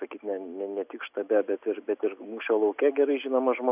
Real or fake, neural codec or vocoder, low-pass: real; none; 3.6 kHz